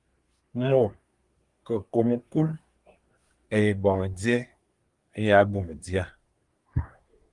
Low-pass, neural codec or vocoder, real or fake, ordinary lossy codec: 10.8 kHz; codec, 24 kHz, 1 kbps, SNAC; fake; Opus, 32 kbps